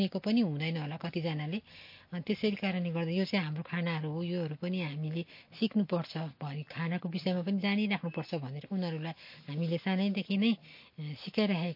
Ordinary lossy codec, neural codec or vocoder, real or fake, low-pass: MP3, 32 kbps; none; real; 5.4 kHz